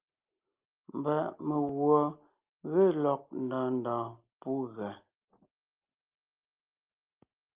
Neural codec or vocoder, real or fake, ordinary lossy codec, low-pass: none; real; Opus, 32 kbps; 3.6 kHz